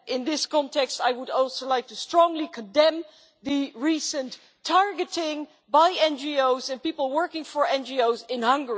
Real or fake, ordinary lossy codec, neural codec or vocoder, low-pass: real; none; none; none